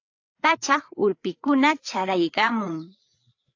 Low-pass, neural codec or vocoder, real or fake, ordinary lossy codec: 7.2 kHz; codec, 16 kHz, 4 kbps, FreqCodec, larger model; fake; AAC, 48 kbps